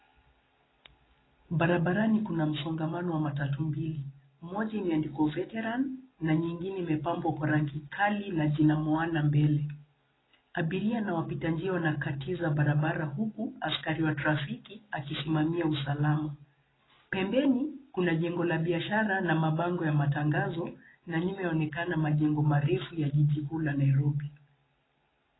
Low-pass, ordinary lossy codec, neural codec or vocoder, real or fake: 7.2 kHz; AAC, 16 kbps; none; real